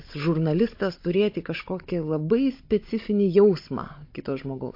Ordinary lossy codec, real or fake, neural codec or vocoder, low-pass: MP3, 32 kbps; fake; codec, 16 kHz, 16 kbps, FunCodec, trained on Chinese and English, 50 frames a second; 5.4 kHz